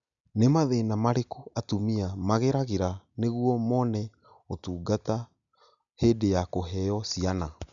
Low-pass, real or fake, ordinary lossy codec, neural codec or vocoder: 7.2 kHz; real; none; none